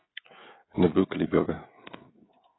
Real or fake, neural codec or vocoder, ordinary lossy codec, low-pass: fake; codec, 16 kHz, 16 kbps, FreqCodec, smaller model; AAC, 16 kbps; 7.2 kHz